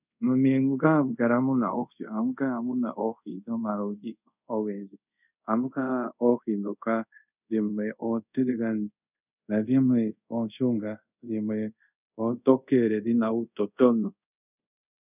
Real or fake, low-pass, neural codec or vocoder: fake; 3.6 kHz; codec, 24 kHz, 0.5 kbps, DualCodec